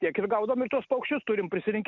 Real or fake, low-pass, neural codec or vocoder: real; 7.2 kHz; none